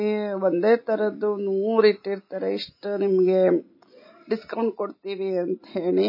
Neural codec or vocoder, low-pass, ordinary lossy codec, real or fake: none; 5.4 kHz; MP3, 24 kbps; real